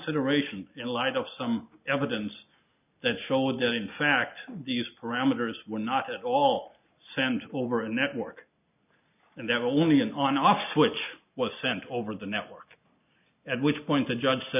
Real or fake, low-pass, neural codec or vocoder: real; 3.6 kHz; none